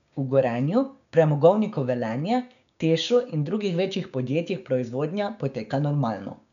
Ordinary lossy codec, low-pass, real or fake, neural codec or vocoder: none; 7.2 kHz; fake; codec, 16 kHz, 6 kbps, DAC